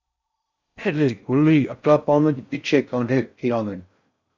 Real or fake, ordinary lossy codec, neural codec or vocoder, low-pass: fake; Opus, 64 kbps; codec, 16 kHz in and 24 kHz out, 0.6 kbps, FocalCodec, streaming, 4096 codes; 7.2 kHz